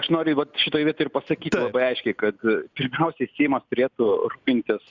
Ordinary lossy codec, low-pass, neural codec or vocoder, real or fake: Opus, 64 kbps; 7.2 kHz; none; real